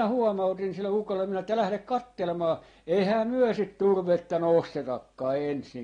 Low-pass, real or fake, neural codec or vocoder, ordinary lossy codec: 9.9 kHz; real; none; AAC, 32 kbps